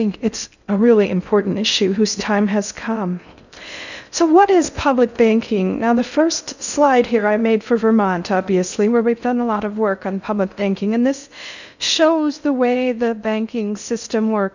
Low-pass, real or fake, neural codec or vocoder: 7.2 kHz; fake; codec, 16 kHz in and 24 kHz out, 0.6 kbps, FocalCodec, streaming, 4096 codes